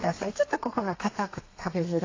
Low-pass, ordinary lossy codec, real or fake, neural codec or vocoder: 7.2 kHz; AAC, 32 kbps; fake; codec, 32 kHz, 1.9 kbps, SNAC